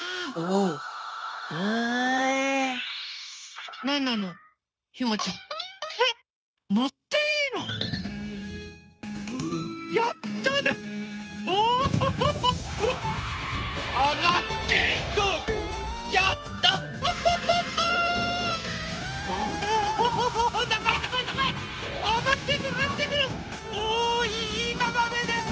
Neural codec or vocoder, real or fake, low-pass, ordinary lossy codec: codec, 16 kHz, 0.9 kbps, LongCat-Audio-Codec; fake; none; none